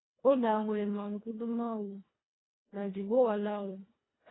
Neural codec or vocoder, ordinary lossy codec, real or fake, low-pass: codec, 24 kHz, 1.5 kbps, HILCodec; AAC, 16 kbps; fake; 7.2 kHz